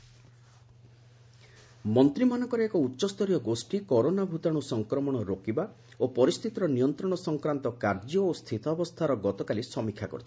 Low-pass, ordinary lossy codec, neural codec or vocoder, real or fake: none; none; none; real